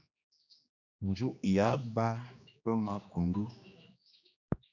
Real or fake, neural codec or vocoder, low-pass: fake; codec, 16 kHz, 2 kbps, X-Codec, HuBERT features, trained on general audio; 7.2 kHz